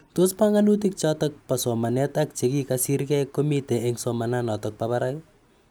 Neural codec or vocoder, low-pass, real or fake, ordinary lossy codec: none; none; real; none